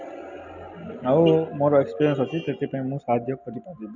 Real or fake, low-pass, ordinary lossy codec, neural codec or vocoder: real; 7.2 kHz; none; none